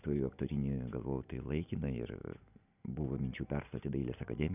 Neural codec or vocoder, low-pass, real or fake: none; 3.6 kHz; real